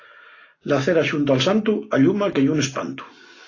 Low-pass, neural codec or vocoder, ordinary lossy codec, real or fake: 7.2 kHz; none; AAC, 32 kbps; real